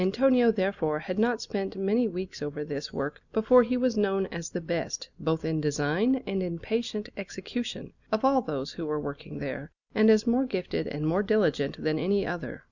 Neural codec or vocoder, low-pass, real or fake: none; 7.2 kHz; real